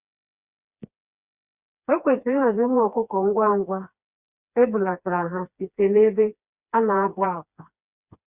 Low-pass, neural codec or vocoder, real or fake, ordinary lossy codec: 3.6 kHz; codec, 16 kHz, 2 kbps, FreqCodec, smaller model; fake; Opus, 64 kbps